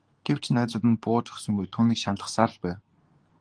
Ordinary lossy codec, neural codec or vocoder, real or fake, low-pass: Opus, 24 kbps; codec, 24 kHz, 0.9 kbps, WavTokenizer, medium speech release version 2; fake; 9.9 kHz